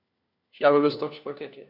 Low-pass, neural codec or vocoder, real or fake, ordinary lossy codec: 5.4 kHz; codec, 16 kHz, 1 kbps, FunCodec, trained on LibriTTS, 50 frames a second; fake; none